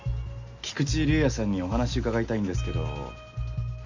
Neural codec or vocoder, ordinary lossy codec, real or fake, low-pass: none; none; real; 7.2 kHz